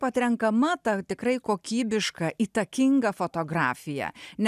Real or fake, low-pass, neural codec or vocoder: real; 14.4 kHz; none